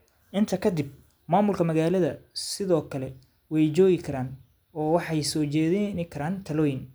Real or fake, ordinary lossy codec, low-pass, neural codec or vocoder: real; none; none; none